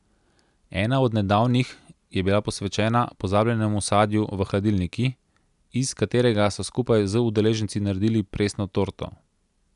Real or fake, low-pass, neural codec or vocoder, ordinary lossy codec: real; 10.8 kHz; none; none